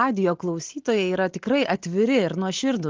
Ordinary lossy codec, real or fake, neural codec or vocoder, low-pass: Opus, 16 kbps; real; none; 7.2 kHz